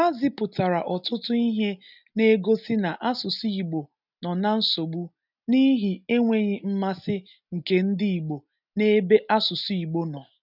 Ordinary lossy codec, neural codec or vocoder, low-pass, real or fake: none; none; 5.4 kHz; real